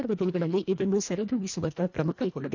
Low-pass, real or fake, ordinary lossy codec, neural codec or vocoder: 7.2 kHz; fake; none; codec, 24 kHz, 1.5 kbps, HILCodec